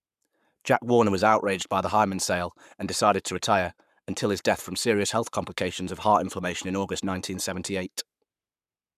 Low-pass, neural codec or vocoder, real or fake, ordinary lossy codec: 14.4 kHz; codec, 44.1 kHz, 7.8 kbps, Pupu-Codec; fake; none